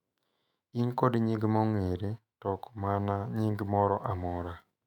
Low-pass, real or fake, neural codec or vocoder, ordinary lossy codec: 19.8 kHz; fake; autoencoder, 48 kHz, 128 numbers a frame, DAC-VAE, trained on Japanese speech; MP3, 96 kbps